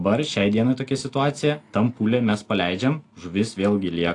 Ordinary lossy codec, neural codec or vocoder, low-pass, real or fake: AAC, 48 kbps; none; 10.8 kHz; real